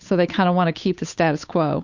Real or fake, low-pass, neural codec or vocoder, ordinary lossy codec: fake; 7.2 kHz; codec, 16 kHz, 8 kbps, FunCodec, trained on Chinese and English, 25 frames a second; Opus, 64 kbps